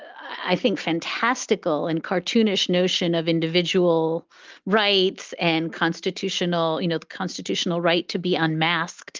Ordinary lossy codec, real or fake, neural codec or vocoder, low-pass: Opus, 32 kbps; real; none; 7.2 kHz